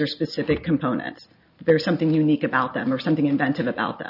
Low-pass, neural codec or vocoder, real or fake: 5.4 kHz; none; real